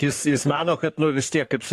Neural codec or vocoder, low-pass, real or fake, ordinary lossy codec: codec, 44.1 kHz, 3.4 kbps, Pupu-Codec; 14.4 kHz; fake; AAC, 64 kbps